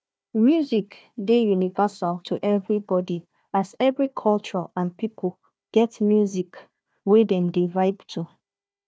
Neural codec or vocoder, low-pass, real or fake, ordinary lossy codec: codec, 16 kHz, 1 kbps, FunCodec, trained on Chinese and English, 50 frames a second; none; fake; none